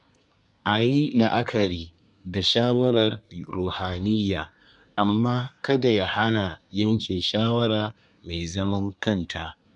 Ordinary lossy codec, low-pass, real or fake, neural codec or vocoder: none; 10.8 kHz; fake; codec, 24 kHz, 1 kbps, SNAC